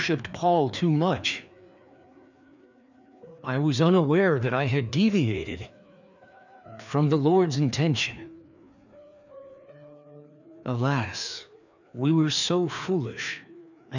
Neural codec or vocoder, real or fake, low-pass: codec, 16 kHz, 2 kbps, FreqCodec, larger model; fake; 7.2 kHz